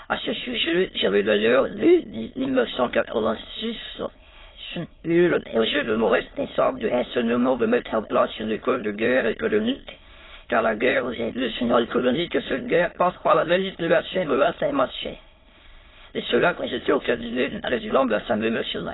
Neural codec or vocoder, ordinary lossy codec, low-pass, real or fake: autoencoder, 22.05 kHz, a latent of 192 numbers a frame, VITS, trained on many speakers; AAC, 16 kbps; 7.2 kHz; fake